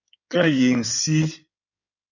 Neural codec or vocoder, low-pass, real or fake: codec, 16 kHz in and 24 kHz out, 2.2 kbps, FireRedTTS-2 codec; 7.2 kHz; fake